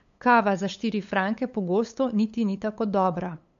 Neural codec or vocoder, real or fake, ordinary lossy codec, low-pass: codec, 16 kHz, 8 kbps, FunCodec, trained on LibriTTS, 25 frames a second; fake; MP3, 48 kbps; 7.2 kHz